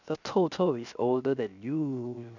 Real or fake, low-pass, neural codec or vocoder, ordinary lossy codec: fake; 7.2 kHz; codec, 16 kHz, 0.7 kbps, FocalCodec; none